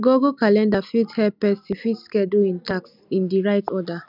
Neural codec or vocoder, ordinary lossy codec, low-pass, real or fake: none; none; 5.4 kHz; real